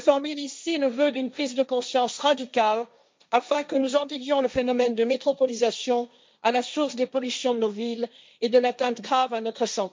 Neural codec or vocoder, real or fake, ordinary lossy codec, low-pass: codec, 16 kHz, 1.1 kbps, Voila-Tokenizer; fake; none; none